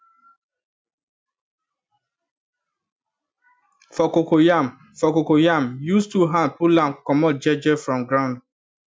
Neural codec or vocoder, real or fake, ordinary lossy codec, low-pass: none; real; none; none